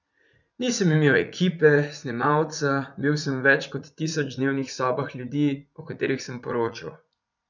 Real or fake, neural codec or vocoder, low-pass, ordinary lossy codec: fake; vocoder, 44.1 kHz, 80 mel bands, Vocos; 7.2 kHz; none